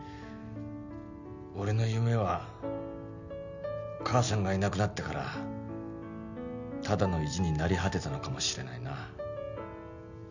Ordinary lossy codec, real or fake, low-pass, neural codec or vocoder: none; real; 7.2 kHz; none